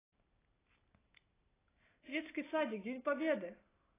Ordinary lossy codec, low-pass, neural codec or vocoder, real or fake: AAC, 16 kbps; 3.6 kHz; none; real